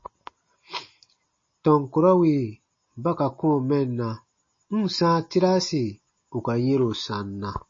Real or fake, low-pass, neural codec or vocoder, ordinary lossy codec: real; 7.2 kHz; none; MP3, 32 kbps